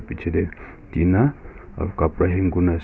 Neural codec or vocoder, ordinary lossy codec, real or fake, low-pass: none; none; real; none